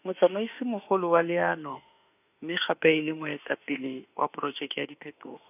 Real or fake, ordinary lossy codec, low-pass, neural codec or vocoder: fake; none; 3.6 kHz; autoencoder, 48 kHz, 32 numbers a frame, DAC-VAE, trained on Japanese speech